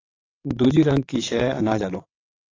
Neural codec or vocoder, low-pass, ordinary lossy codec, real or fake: vocoder, 22.05 kHz, 80 mel bands, WaveNeXt; 7.2 kHz; AAC, 32 kbps; fake